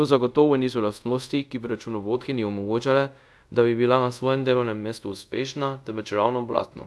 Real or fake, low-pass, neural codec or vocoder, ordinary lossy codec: fake; none; codec, 24 kHz, 0.5 kbps, DualCodec; none